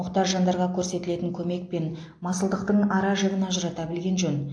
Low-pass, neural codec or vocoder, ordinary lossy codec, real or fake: 9.9 kHz; none; AAC, 48 kbps; real